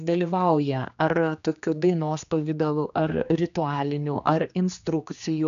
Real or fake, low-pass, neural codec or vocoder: fake; 7.2 kHz; codec, 16 kHz, 2 kbps, X-Codec, HuBERT features, trained on general audio